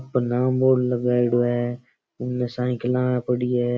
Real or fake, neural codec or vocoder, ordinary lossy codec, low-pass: real; none; none; none